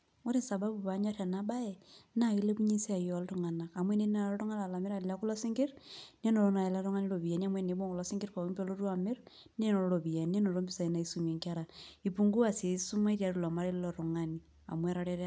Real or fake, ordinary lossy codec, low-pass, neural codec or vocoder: real; none; none; none